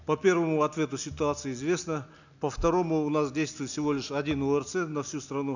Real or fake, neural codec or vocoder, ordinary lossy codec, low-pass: real; none; none; 7.2 kHz